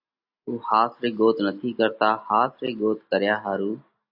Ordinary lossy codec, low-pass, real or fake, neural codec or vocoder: AAC, 48 kbps; 5.4 kHz; real; none